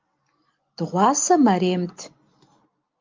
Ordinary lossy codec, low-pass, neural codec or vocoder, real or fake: Opus, 24 kbps; 7.2 kHz; none; real